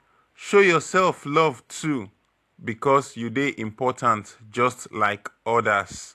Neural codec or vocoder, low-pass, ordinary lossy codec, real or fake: none; 14.4 kHz; AAC, 64 kbps; real